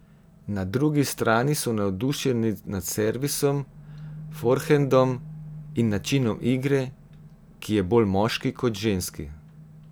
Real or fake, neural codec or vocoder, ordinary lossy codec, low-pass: real; none; none; none